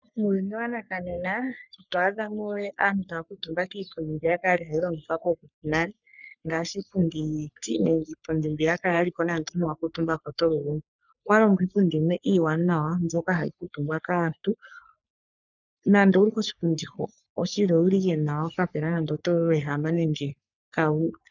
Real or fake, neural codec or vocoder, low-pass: fake; codec, 44.1 kHz, 3.4 kbps, Pupu-Codec; 7.2 kHz